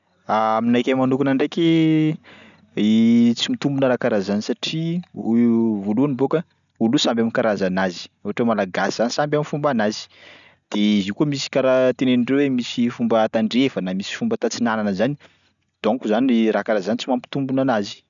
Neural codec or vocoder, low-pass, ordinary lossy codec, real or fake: none; 7.2 kHz; none; real